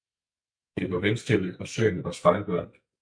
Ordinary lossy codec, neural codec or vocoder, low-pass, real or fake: MP3, 96 kbps; codec, 44.1 kHz, 2.6 kbps, SNAC; 9.9 kHz; fake